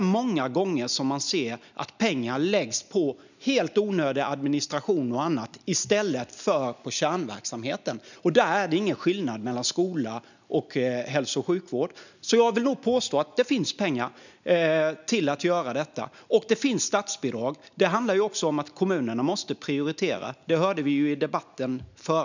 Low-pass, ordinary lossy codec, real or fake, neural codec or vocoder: 7.2 kHz; none; real; none